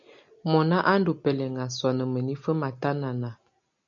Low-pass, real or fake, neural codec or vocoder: 7.2 kHz; real; none